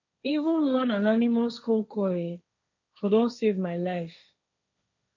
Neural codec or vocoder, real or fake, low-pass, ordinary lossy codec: codec, 16 kHz, 1.1 kbps, Voila-Tokenizer; fake; 7.2 kHz; none